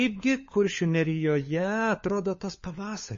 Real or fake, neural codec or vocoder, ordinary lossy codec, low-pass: fake; codec, 16 kHz, 4 kbps, FunCodec, trained on LibriTTS, 50 frames a second; MP3, 32 kbps; 7.2 kHz